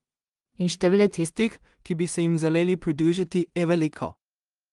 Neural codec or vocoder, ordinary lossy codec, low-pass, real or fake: codec, 16 kHz in and 24 kHz out, 0.4 kbps, LongCat-Audio-Codec, two codebook decoder; Opus, 32 kbps; 10.8 kHz; fake